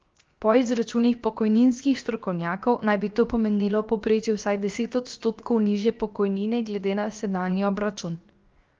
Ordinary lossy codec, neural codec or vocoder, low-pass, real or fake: Opus, 32 kbps; codec, 16 kHz, 0.7 kbps, FocalCodec; 7.2 kHz; fake